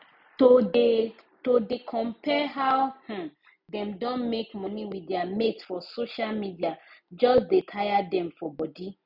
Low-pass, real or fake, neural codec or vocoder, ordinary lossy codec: 5.4 kHz; real; none; none